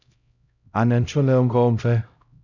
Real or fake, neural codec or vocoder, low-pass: fake; codec, 16 kHz, 0.5 kbps, X-Codec, HuBERT features, trained on LibriSpeech; 7.2 kHz